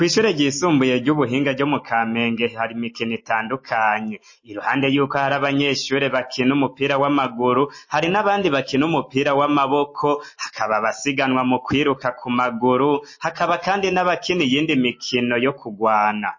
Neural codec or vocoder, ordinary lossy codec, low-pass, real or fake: none; MP3, 32 kbps; 7.2 kHz; real